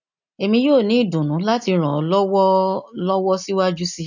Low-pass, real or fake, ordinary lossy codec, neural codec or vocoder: 7.2 kHz; real; none; none